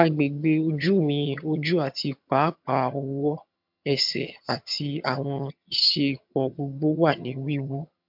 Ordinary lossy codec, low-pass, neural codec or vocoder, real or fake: MP3, 48 kbps; 5.4 kHz; vocoder, 22.05 kHz, 80 mel bands, HiFi-GAN; fake